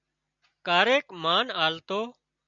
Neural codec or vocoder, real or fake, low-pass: none; real; 7.2 kHz